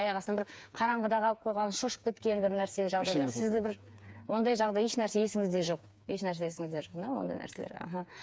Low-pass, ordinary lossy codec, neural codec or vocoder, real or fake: none; none; codec, 16 kHz, 4 kbps, FreqCodec, smaller model; fake